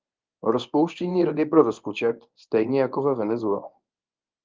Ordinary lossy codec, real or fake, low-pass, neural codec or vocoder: Opus, 24 kbps; fake; 7.2 kHz; codec, 24 kHz, 0.9 kbps, WavTokenizer, medium speech release version 1